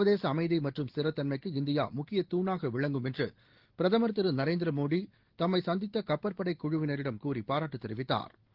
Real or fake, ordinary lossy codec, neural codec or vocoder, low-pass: real; Opus, 16 kbps; none; 5.4 kHz